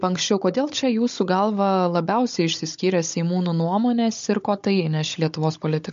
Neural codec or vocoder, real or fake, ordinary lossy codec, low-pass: codec, 16 kHz, 16 kbps, FunCodec, trained on Chinese and English, 50 frames a second; fake; MP3, 48 kbps; 7.2 kHz